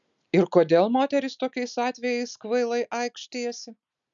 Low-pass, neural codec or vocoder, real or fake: 7.2 kHz; none; real